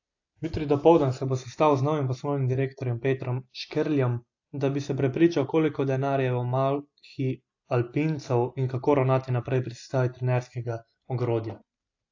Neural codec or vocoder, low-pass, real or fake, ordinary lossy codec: none; 7.2 kHz; real; AAC, 48 kbps